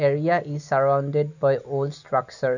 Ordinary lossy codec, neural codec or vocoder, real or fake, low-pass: none; none; real; 7.2 kHz